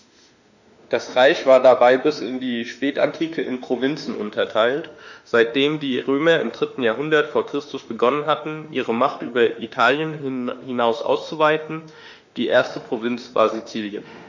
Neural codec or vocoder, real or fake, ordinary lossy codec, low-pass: autoencoder, 48 kHz, 32 numbers a frame, DAC-VAE, trained on Japanese speech; fake; none; 7.2 kHz